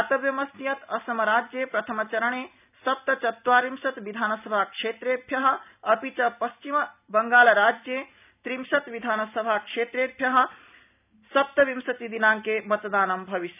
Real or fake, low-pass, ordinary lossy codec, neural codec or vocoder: real; 3.6 kHz; none; none